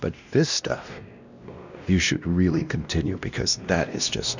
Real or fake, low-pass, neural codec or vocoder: fake; 7.2 kHz; codec, 16 kHz, 1 kbps, X-Codec, WavLM features, trained on Multilingual LibriSpeech